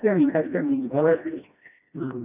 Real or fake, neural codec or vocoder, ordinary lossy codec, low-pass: fake; codec, 16 kHz, 1 kbps, FreqCodec, smaller model; none; 3.6 kHz